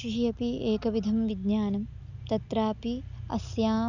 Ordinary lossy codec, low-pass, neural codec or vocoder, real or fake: none; 7.2 kHz; none; real